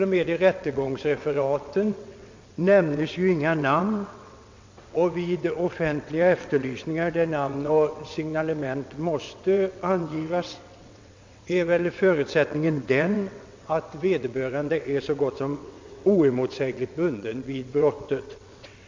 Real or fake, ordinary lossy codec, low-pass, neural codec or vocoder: fake; MP3, 48 kbps; 7.2 kHz; vocoder, 22.05 kHz, 80 mel bands, WaveNeXt